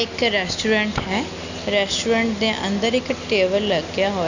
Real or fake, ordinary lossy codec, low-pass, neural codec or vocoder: real; none; 7.2 kHz; none